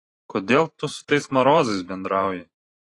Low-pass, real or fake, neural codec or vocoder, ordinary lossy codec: 10.8 kHz; fake; vocoder, 44.1 kHz, 128 mel bands every 512 samples, BigVGAN v2; AAC, 32 kbps